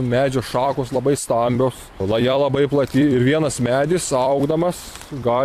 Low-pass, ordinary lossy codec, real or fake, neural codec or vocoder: 14.4 kHz; AAC, 64 kbps; fake; vocoder, 44.1 kHz, 128 mel bands every 256 samples, BigVGAN v2